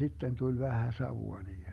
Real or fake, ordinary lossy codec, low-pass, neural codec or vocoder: real; Opus, 32 kbps; 19.8 kHz; none